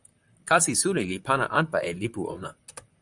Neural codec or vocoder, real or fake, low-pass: vocoder, 44.1 kHz, 128 mel bands, Pupu-Vocoder; fake; 10.8 kHz